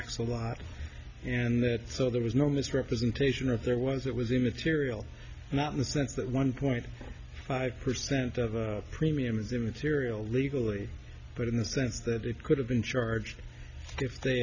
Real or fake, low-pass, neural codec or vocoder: real; 7.2 kHz; none